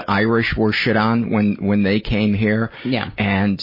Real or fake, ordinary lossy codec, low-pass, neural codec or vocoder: real; MP3, 24 kbps; 5.4 kHz; none